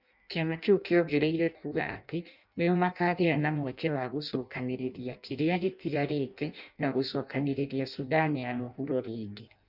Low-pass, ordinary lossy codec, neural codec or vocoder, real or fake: 5.4 kHz; none; codec, 16 kHz in and 24 kHz out, 0.6 kbps, FireRedTTS-2 codec; fake